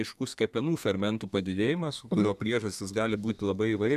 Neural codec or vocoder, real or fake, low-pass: codec, 32 kHz, 1.9 kbps, SNAC; fake; 14.4 kHz